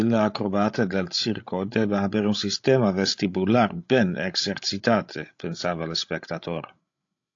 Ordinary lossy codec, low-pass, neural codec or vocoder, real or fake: AAC, 48 kbps; 7.2 kHz; codec, 16 kHz, 16 kbps, FreqCodec, larger model; fake